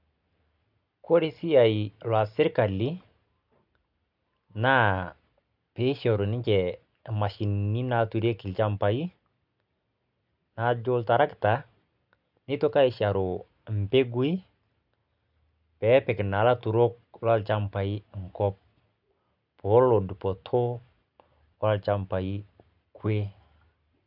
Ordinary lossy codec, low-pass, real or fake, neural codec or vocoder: none; 5.4 kHz; real; none